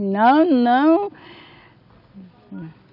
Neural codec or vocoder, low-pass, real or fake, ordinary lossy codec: none; 5.4 kHz; real; none